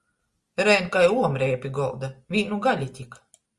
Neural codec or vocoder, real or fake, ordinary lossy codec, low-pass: none; real; Opus, 32 kbps; 10.8 kHz